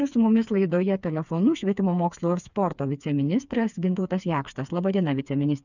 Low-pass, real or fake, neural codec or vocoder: 7.2 kHz; fake; codec, 16 kHz, 4 kbps, FreqCodec, smaller model